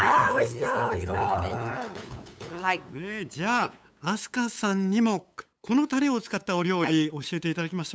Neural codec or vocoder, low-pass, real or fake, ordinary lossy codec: codec, 16 kHz, 8 kbps, FunCodec, trained on LibriTTS, 25 frames a second; none; fake; none